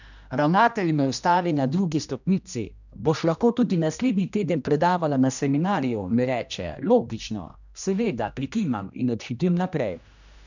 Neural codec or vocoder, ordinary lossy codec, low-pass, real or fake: codec, 16 kHz, 1 kbps, X-Codec, HuBERT features, trained on general audio; none; 7.2 kHz; fake